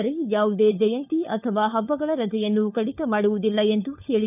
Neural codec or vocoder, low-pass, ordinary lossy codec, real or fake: codec, 16 kHz, 4 kbps, FunCodec, trained on LibriTTS, 50 frames a second; 3.6 kHz; none; fake